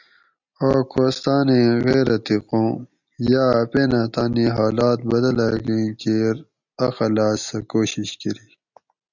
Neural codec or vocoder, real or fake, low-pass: none; real; 7.2 kHz